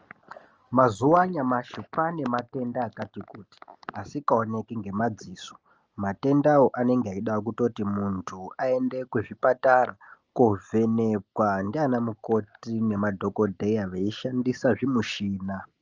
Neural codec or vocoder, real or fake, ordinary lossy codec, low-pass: none; real; Opus, 24 kbps; 7.2 kHz